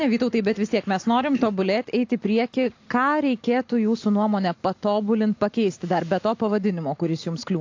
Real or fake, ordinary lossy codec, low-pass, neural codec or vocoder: real; AAC, 48 kbps; 7.2 kHz; none